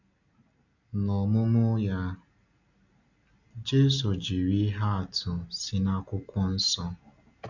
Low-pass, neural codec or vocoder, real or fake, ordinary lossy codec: 7.2 kHz; none; real; none